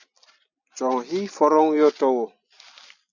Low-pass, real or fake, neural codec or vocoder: 7.2 kHz; real; none